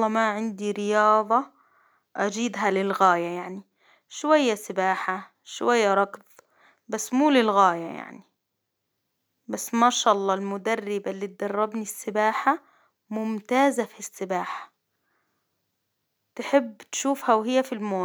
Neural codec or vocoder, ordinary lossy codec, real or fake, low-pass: none; none; real; none